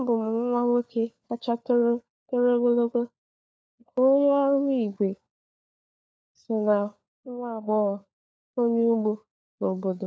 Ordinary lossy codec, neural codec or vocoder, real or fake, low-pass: none; codec, 16 kHz, 4 kbps, FunCodec, trained on LibriTTS, 50 frames a second; fake; none